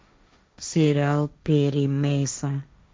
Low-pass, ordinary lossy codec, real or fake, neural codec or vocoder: none; none; fake; codec, 16 kHz, 1.1 kbps, Voila-Tokenizer